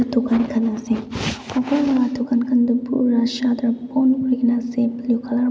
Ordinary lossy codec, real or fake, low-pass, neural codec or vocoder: none; real; none; none